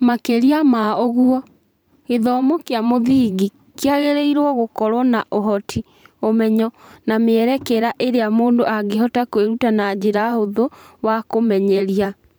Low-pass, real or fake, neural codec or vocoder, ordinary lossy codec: none; fake; vocoder, 44.1 kHz, 128 mel bands every 512 samples, BigVGAN v2; none